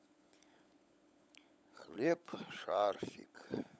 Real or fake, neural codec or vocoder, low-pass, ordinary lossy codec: fake; codec, 16 kHz, 16 kbps, FunCodec, trained on LibriTTS, 50 frames a second; none; none